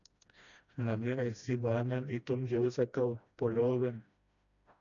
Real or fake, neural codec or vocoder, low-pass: fake; codec, 16 kHz, 1 kbps, FreqCodec, smaller model; 7.2 kHz